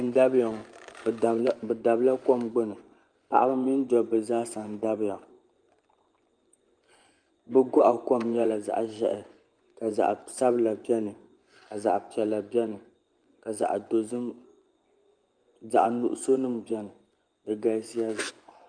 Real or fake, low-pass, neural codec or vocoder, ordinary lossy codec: fake; 9.9 kHz; vocoder, 24 kHz, 100 mel bands, Vocos; Opus, 32 kbps